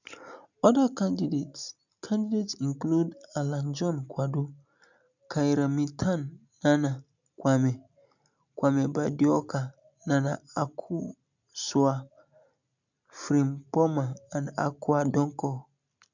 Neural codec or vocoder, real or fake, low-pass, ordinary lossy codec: none; real; 7.2 kHz; none